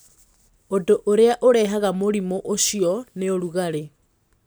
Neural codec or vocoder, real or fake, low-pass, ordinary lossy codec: none; real; none; none